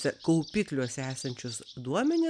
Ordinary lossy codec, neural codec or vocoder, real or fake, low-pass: MP3, 96 kbps; none; real; 9.9 kHz